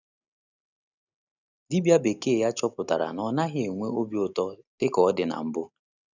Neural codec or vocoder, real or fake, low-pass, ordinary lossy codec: none; real; 7.2 kHz; none